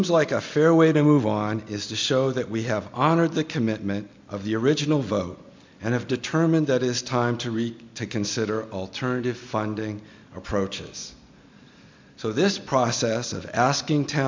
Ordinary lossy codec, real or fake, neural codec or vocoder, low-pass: MP3, 64 kbps; real; none; 7.2 kHz